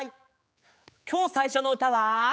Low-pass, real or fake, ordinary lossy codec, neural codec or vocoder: none; fake; none; codec, 16 kHz, 4 kbps, X-Codec, HuBERT features, trained on general audio